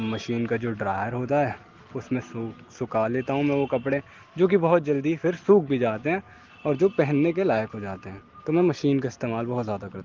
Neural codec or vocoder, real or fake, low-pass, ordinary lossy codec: none; real; 7.2 kHz; Opus, 16 kbps